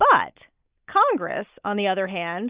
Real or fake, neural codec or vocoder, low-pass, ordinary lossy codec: real; none; 3.6 kHz; Opus, 64 kbps